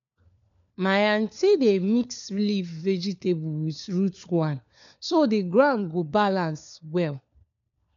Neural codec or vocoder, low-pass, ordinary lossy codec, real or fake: codec, 16 kHz, 4 kbps, FunCodec, trained on LibriTTS, 50 frames a second; 7.2 kHz; MP3, 96 kbps; fake